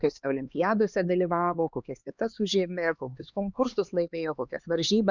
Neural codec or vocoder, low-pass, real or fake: codec, 16 kHz, 2 kbps, X-Codec, HuBERT features, trained on LibriSpeech; 7.2 kHz; fake